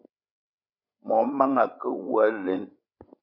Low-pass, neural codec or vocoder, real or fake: 5.4 kHz; codec, 16 kHz, 8 kbps, FreqCodec, larger model; fake